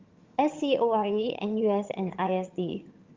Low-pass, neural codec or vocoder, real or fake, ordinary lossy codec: 7.2 kHz; vocoder, 22.05 kHz, 80 mel bands, HiFi-GAN; fake; Opus, 32 kbps